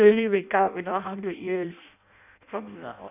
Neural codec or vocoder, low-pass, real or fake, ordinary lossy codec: codec, 16 kHz in and 24 kHz out, 0.6 kbps, FireRedTTS-2 codec; 3.6 kHz; fake; none